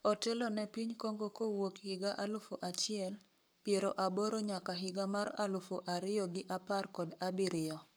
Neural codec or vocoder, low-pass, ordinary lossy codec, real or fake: codec, 44.1 kHz, 7.8 kbps, Pupu-Codec; none; none; fake